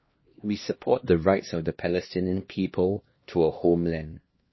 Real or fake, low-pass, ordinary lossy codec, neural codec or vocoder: fake; 7.2 kHz; MP3, 24 kbps; codec, 16 kHz, 1 kbps, X-Codec, HuBERT features, trained on LibriSpeech